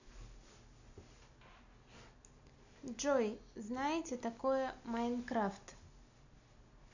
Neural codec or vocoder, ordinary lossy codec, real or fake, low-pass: none; none; real; 7.2 kHz